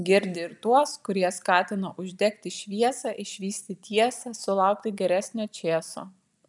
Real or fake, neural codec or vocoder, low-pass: real; none; 10.8 kHz